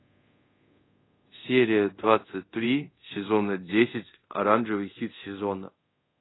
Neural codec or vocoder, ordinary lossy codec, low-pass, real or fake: codec, 24 kHz, 0.5 kbps, DualCodec; AAC, 16 kbps; 7.2 kHz; fake